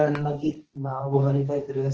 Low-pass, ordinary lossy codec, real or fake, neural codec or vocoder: 7.2 kHz; Opus, 16 kbps; fake; codec, 16 kHz, 1.1 kbps, Voila-Tokenizer